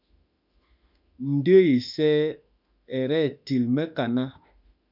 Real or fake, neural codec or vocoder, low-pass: fake; autoencoder, 48 kHz, 32 numbers a frame, DAC-VAE, trained on Japanese speech; 5.4 kHz